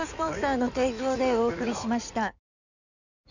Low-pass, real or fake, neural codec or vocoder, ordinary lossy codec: 7.2 kHz; fake; codec, 16 kHz in and 24 kHz out, 2.2 kbps, FireRedTTS-2 codec; none